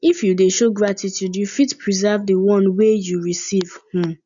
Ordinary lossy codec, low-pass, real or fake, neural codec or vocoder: none; 7.2 kHz; real; none